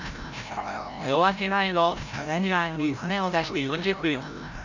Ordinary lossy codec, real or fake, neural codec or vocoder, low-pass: none; fake; codec, 16 kHz, 0.5 kbps, FreqCodec, larger model; 7.2 kHz